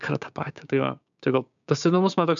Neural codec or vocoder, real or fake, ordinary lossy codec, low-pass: codec, 16 kHz, 6 kbps, DAC; fake; MP3, 96 kbps; 7.2 kHz